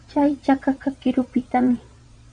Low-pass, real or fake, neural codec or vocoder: 9.9 kHz; fake; vocoder, 22.05 kHz, 80 mel bands, Vocos